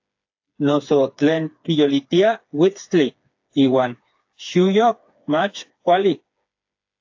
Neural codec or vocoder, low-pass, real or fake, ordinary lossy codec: codec, 16 kHz, 4 kbps, FreqCodec, smaller model; 7.2 kHz; fake; AAC, 48 kbps